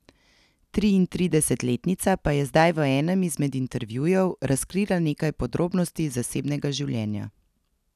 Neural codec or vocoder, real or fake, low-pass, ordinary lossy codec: none; real; 14.4 kHz; none